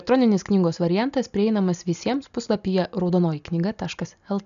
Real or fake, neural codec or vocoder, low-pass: real; none; 7.2 kHz